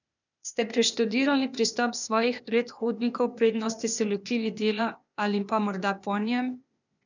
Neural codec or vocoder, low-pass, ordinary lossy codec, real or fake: codec, 16 kHz, 0.8 kbps, ZipCodec; 7.2 kHz; none; fake